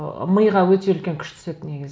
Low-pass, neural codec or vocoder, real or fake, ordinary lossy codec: none; none; real; none